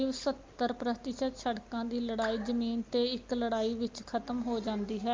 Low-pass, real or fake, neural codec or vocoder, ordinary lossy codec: 7.2 kHz; real; none; Opus, 32 kbps